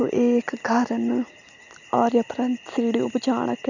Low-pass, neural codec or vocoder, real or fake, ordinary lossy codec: 7.2 kHz; none; real; none